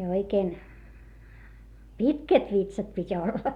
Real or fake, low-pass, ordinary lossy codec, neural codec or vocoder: real; 19.8 kHz; Opus, 64 kbps; none